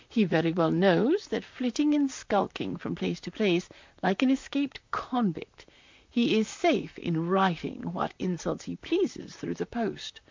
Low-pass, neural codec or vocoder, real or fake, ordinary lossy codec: 7.2 kHz; vocoder, 44.1 kHz, 128 mel bands, Pupu-Vocoder; fake; MP3, 64 kbps